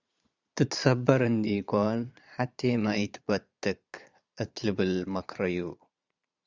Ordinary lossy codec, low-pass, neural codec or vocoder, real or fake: Opus, 64 kbps; 7.2 kHz; vocoder, 22.05 kHz, 80 mel bands, Vocos; fake